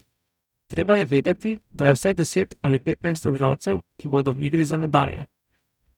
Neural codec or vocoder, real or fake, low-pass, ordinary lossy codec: codec, 44.1 kHz, 0.9 kbps, DAC; fake; 19.8 kHz; none